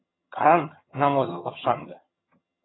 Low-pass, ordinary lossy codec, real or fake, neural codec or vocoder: 7.2 kHz; AAC, 16 kbps; fake; vocoder, 22.05 kHz, 80 mel bands, HiFi-GAN